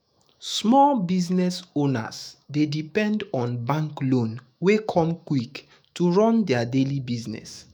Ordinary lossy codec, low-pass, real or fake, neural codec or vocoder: none; none; fake; autoencoder, 48 kHz, 128 numbers a frame, DAC-VAE, trained on Japanese speech